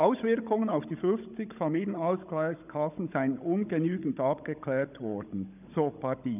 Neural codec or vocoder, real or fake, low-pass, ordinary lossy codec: codec, 16 kHz, 16 kbps, FunCodec, trained on Chinese and English, 50 frames a second; fake; 3.6 kHz; none